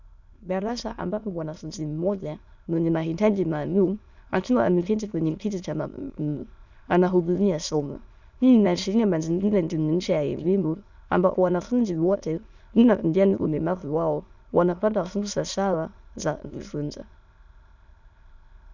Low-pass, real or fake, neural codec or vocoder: 7.2 kHz; fake; autoencoder, 22.05 kHz, a latent of 192 numbers a frame, VITS, trained on many speakers